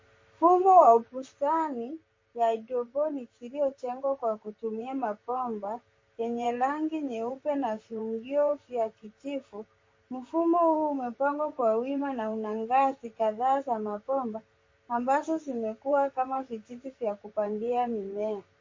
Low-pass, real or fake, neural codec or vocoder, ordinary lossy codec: 7.2 kHz; fake; vocoder, 44.1 kHz, 128 mel bands, Pupu-Vocoder; MP3, 32 kbps